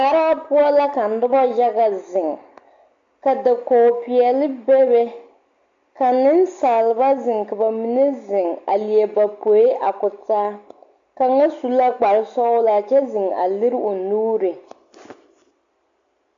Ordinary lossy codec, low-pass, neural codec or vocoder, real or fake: AAC, 64 kbps; 7.2 kHz; none; real